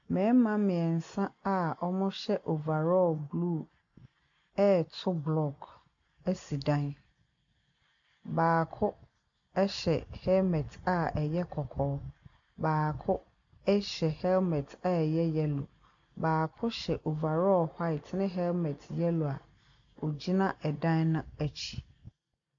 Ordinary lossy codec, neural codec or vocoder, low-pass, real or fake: AAC, 64 kbps; none; 7.2 kHz; real